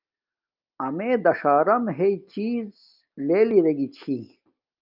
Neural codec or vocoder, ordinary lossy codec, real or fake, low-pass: none; Opus, 24 kbps; real; 5.4 kHz